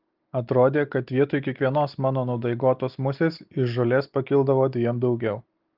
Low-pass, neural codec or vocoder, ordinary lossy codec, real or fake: 5.4 kHz; none; Opus, 24 kbps; real